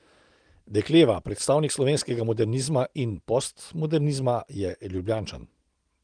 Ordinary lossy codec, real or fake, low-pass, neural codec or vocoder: Opus, 24 kbps; real; 9.9 kHz; none